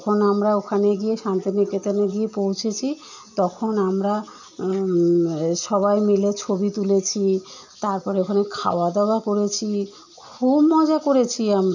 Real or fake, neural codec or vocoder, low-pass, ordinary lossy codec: real; none; 7.2 kHz; none